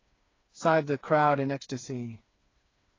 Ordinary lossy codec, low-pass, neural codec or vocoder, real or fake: AAC, 32 kbps; 7.2 kHz; codec, 16 kHz, 4 kbps, FreqCodec, smaller model; fake